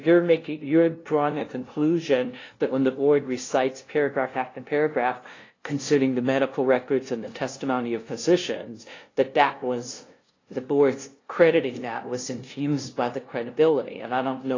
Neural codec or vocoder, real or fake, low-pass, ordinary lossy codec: codec, 16 kHz, 0.5 kbps, FunCodec, trained on LibriTTS, 25 frames a second; fake; 7.2 kHz; AAC, 32 kbps